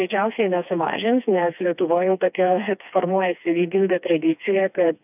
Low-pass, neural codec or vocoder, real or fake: 3.6 kHz; codec, 16 kHz, 2 kbps, FreqCodec, smaller model; fake